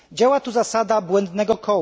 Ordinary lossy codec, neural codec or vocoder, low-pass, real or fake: none; none; none; real